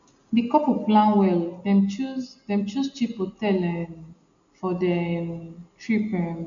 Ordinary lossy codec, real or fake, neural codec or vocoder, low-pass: none; real; none; 7.2 kHz